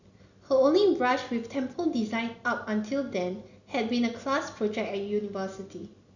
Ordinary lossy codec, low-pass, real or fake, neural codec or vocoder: none; 7.2 kHz; real; none